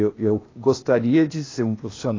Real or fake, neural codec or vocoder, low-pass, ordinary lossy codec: fake; codec, 16 kHz, 0.8 kbps, ZipCodec; 7.2 kHz; AAC, 32 kbps